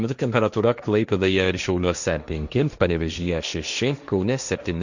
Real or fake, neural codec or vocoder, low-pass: fake; codec, 16 kHz, 1.1 kbps, Voila-Tokenizer; 7.2 kHz